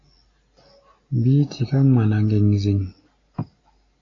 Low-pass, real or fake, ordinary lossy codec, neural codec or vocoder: 7.2 kHz; real; AAC, 32 kbps; none